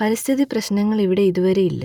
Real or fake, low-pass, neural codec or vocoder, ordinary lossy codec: fake; 19.8 kHz; vocoder, 44.1 kHz, 128 mel bands every 256 samples, BigVGAN v2; none